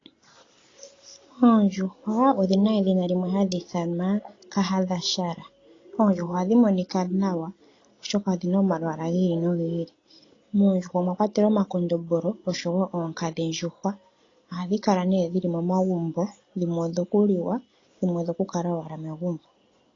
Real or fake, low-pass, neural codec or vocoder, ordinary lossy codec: real; 7.2 kHz; none; AAC, 32 kbps